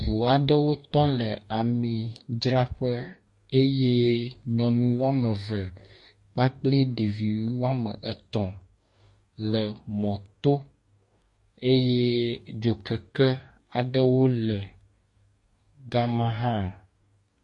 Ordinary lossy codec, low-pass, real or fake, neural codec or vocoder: MP3, 48 kbps; 10.8 kHz; fake; codec, 44.1 kHz, 2.6 kbps, DAC